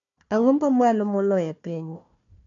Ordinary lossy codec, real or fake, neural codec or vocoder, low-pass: none; fake; codec, 16 kHz, 1 kbps, FunCodec, trained on Chinese and English, 50 frames a second; 7.2 kHz